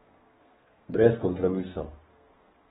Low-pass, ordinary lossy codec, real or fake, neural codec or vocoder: 14.4 kHz; AAC, 16 kbps; fake; codec, 32 kHz, 1.9 kbps, SNAC